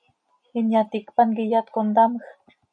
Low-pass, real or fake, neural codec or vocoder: 9.9 kHz; real; none